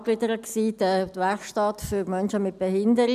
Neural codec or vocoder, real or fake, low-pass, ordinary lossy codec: none; real; 14.4 kHz; none